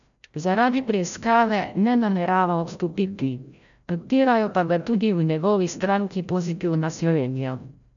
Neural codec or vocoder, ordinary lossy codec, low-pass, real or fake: codec, 16 kHz, 0.5 kbps, FreqCodec, larger model; none; 7.2 kHz; fake